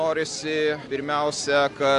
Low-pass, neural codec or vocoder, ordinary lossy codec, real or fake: 10.8 kHz; none; AAC, 64 kbps; real